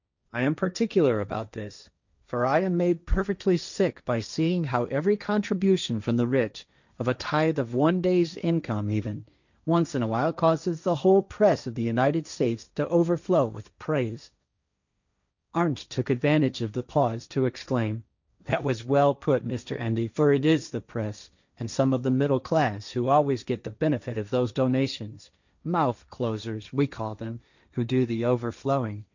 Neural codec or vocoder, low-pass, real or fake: codec, 16 kHz, 1.1 kbps, Voila-Tokenizer; 7.2 kHz; fake